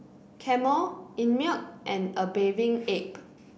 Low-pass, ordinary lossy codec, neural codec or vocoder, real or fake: none; none; none; real